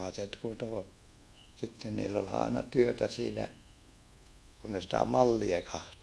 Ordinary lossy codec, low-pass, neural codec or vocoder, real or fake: none; none; codec, 24 kHz, 1.2 kbps, DualCodec; fake